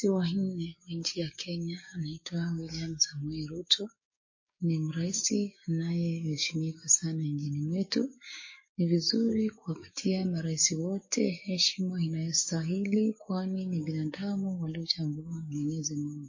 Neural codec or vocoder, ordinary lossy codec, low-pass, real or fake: codec, 16 kHz, 6 kbps, DAC; MP3, 32 kbps; 7.2 kHz; fake